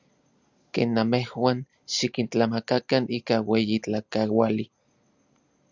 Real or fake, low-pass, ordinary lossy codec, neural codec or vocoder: real; 7.2 kHz; Opus, 64 kbps; none